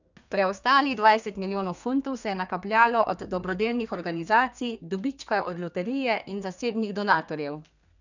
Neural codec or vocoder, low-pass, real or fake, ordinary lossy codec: codec, 32 kHz, 1.9 kbps, SNAC; 7.2 kHz; fake; none